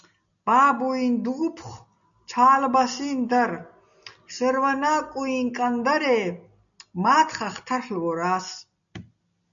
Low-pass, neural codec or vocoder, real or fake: 7.2 kHz; none; real